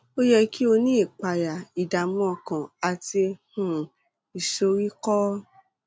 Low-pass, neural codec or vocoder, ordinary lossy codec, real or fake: none; none; none; real